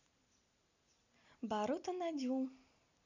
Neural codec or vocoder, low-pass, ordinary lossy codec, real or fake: none; 7.2 kHz; none; real